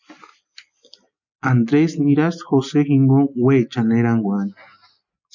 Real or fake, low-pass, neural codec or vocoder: real; 7.2 kHz; none